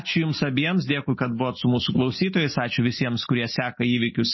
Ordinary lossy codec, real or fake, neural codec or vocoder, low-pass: MP3, 24 kbps; real; none; 7.2 kHz